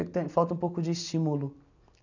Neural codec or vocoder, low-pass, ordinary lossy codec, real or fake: none; 7.2 kHz; none; real